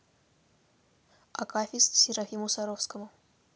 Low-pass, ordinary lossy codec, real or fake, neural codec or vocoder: none; none; real; none